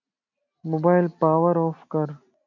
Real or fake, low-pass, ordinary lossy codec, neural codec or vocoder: real; 7.2 kHz; MP3, 64 kbps; none